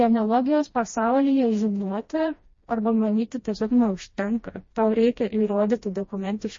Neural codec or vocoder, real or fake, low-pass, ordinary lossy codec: codec, 16 kHz, 1 kbps, FreqCodec, smaller model; fake; 7.2 kHz; MP3, 32 kbps